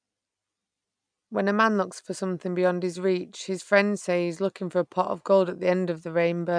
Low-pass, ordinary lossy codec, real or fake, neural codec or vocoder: 9.9 kHz; none; real; none